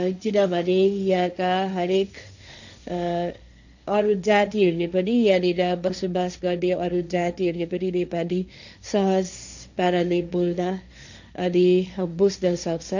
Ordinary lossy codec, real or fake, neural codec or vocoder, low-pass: none; fake; codec, 16 kHz, 1.1 kbps, Voila-Tokenizer; 7.2 kHz